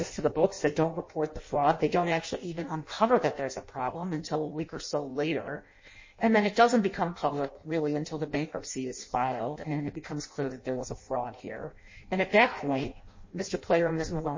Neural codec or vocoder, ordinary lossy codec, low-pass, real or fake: codec, 16 kHz in and 24 kHz out, 0.6 kbps, FireRedTTS-2 codec; MP3, 32 kbps; 7.2 kHz; fake